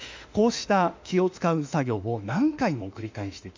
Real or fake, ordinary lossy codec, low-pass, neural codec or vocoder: fake; MP3, 64 kbps; 7.2 kHz; autoencoder, 48 kHz, 32 numbers a frame, DAC-VAE, trained on Japanese speech